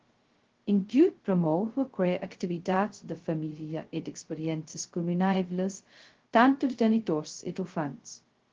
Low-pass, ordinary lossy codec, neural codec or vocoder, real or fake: 7.2 kHz; Opus, 16 kbps; codec, 16 kHz, 0.2 kbps, FocalCodec; fake